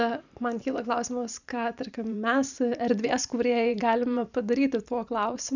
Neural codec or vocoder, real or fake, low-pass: vocoder, 44.1 kHz, 128 mel bands every 512 samples, BigVGAN v2; fake; 7.2 kHz